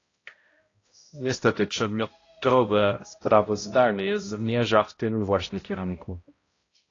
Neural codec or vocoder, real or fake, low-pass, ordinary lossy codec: codec, 16 kHz, 0.5 kbps, X-Codec, HuBERT features, trained on balanced general audio; fake; 7.2 kHz; AAC, 32 kbps